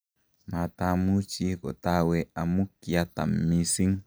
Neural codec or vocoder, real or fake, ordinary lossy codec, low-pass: none; real; none; none